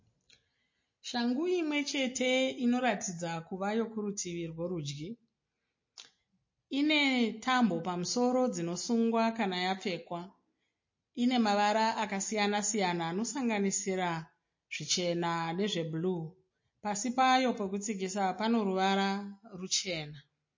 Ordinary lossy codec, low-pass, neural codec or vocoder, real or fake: MP3, 32 kbps; 7.2 kHz; none; real